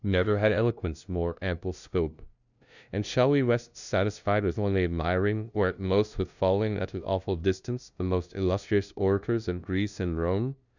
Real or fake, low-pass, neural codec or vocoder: fake; 7.2 kHz; codec, 16 kHz, 0.5 kbps, FunCodec, trained on LibriTTS, 25 frames a second